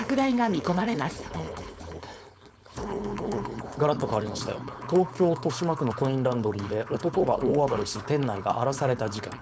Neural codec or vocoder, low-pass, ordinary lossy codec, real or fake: codec, 16 kHz, 4.8 kbps, FACodec; none; none; fake